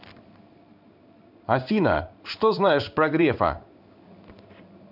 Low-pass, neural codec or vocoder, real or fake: 5.4 kHz; codec, 16 kHz in and 24 kHz out, 1 kbps, XY-Tokenizer; fake